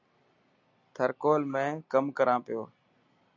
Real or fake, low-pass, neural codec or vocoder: real; 7.2 kHz; none